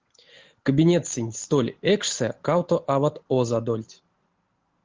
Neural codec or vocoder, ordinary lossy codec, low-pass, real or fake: none; Opus, 16 kbps; 7.2 kHz; real